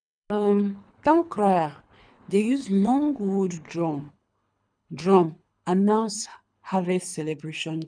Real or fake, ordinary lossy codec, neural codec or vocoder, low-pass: fake; none; codec, 24 kHz, 3 kbps, HILCodec; 9.9 kHz